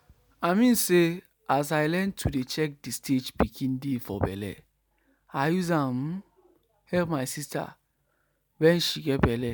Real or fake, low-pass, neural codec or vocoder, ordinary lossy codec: real; none; none; none